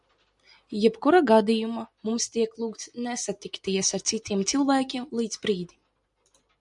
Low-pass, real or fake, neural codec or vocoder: 10.8 kHz; real; none